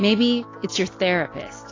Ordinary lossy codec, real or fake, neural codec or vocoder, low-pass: AAC, 32 kbps; real; none; 7.2 kHz